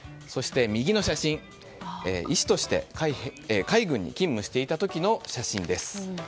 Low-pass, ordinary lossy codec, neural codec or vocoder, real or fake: none; none; none; real